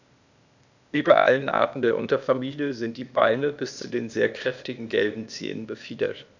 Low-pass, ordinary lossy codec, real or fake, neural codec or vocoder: 7.2 kHz; none; fake; codec, 16 kHz, 0.8 kbps, ZipCodec